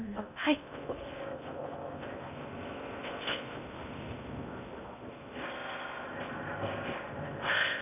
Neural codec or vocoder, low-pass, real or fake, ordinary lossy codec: codec, 16 kHz in and 24 kHz out, 0.6 kbps, FocalCodec, streaming, 2048 codes; 3.6 kHz; fake; none